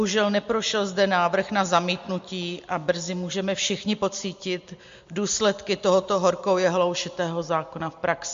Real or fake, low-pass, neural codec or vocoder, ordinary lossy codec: real; 7.2 kHz; none; MP3, 48 kbps